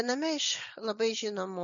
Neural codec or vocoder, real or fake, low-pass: none; real; 7.2 kHz